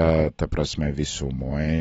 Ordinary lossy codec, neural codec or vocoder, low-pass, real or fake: AAC, 24 kbps; none; 10.8 kHz; real